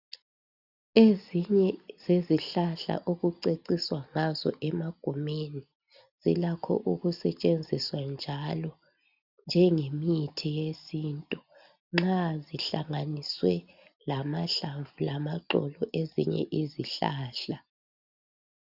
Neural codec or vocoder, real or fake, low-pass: none; real; 5.4 kHz